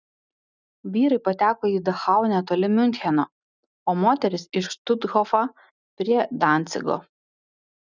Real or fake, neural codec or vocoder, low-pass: real; none; 7.2 kHz